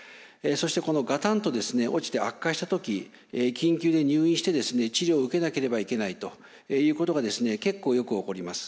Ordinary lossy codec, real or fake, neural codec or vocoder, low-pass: none; real; none; none